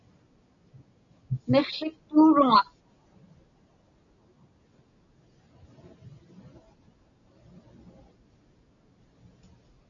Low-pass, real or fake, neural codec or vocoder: 7.2 kHz; real; none